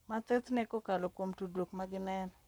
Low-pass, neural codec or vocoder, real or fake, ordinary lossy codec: none; codec, 44.1 kHz, 7.8 kbps, Pupu-Codec; fake; none